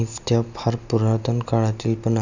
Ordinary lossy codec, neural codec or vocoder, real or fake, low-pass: none; none; real; 7.2 kHz